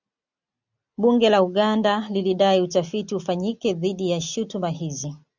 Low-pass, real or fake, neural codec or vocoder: 7.2 kHz; real; none